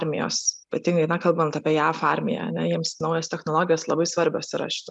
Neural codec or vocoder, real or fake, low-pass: none; real; 10.8 kHz